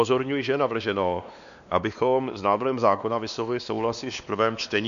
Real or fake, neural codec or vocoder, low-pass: fake; codec, 16 kHz, 2 kbps, X-Codec, WavLM features, trained on Multilingual LibriSpeech; 7.2 kHz